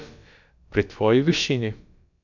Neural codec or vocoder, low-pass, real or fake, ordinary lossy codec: codec, 16 kHz, about 1 kbps, DyCAST, with the encoder's durations; 7.2 kHz; fake; none